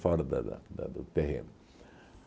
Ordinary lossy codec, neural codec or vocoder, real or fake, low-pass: none; none; real; none